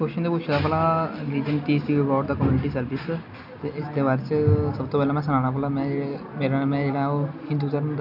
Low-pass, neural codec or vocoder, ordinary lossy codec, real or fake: 5.4 kHz; none; none; real